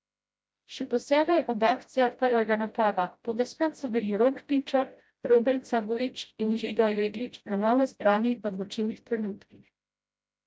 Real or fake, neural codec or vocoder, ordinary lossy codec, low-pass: fake; codec, 16 kHz, 0.5 kbps, FreqCodec, smaller model; none; none